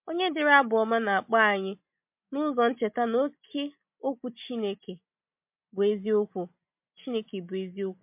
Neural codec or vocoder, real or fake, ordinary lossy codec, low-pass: none; real; MP3, 32 kbps; 3.6 kHz